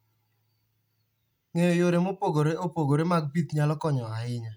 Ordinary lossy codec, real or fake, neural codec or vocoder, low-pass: none; real; none; 19.8 kHz